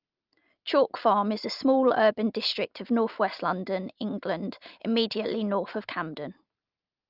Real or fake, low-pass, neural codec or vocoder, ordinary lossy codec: real; 5.4 kHz; none; Opus, 24 kbps